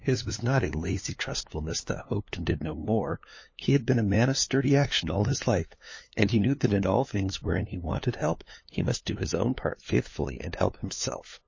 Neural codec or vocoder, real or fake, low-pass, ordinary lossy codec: codec, 16 kHz, 2 kbps, FunCodec, trained on LibriTTS, 25 frames a second; fake; 7.2 kHz; MP3, 32 kbps